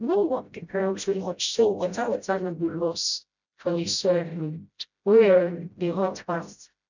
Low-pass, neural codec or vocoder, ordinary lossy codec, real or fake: 7.2 kHz; codec, 16 kHz, 0.5 kbps, FreqCodec, smaller model; none; fake